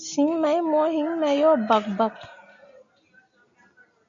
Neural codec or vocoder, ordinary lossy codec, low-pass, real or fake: none; AAC, 64 kbps; 7.2 kHz; real